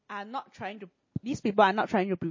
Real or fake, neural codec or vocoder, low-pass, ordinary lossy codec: real; none; 7.2 kHz; MP3, 32 kbps